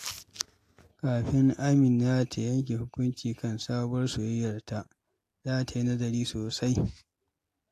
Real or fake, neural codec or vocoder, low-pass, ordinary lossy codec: real; none; 14.4 kHz; AAC, 64 kbps